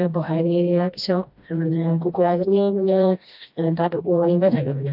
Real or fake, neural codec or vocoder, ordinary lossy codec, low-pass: fake; codec, 16 kHz, 1 kbps, FreqCodec, smaller model; AAC, 48 kbps; 5.4 kHz